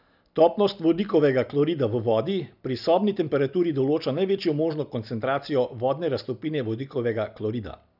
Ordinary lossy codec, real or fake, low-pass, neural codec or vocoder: none; real; 5.4 kHz; none